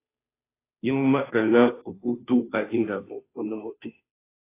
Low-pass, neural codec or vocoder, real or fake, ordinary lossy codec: 3.6 kHz; codec, 16 kHz, 0.5 kbps, FunCodec, trained on Chinese and English, 25 frames a second; fake; AAC, 24 kbps